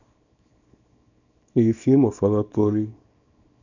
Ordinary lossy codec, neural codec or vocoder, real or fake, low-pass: none; codec, 24 kHz, 0.9 kbps, WavTokenizer, small release; fake; 7.2 kHz